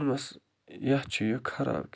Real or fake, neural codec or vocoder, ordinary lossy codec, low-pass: real; none; none; none